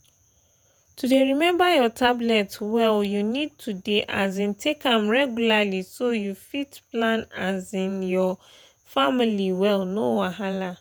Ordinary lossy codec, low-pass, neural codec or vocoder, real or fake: none; none; vocoder, 48 kHz, 128 mel bands, Vocos; fake